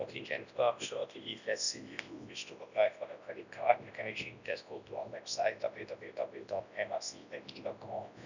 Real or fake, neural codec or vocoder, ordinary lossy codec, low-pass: fake; codec, 24 kHz, 0.9 kbps, WavTokenizer, large speech release; none; 7.2 kHz